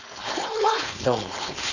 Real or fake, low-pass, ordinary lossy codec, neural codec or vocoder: fake; 7.2 kHz; none; codec, 16 kHz, 4.8 kbps, FACodec